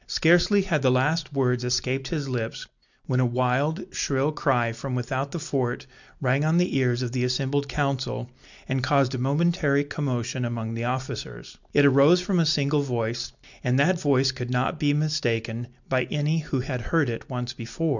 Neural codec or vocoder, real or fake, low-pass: none; real; 7.2 kHz